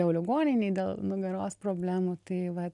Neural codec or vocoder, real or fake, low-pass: none; real; 10.8 kHz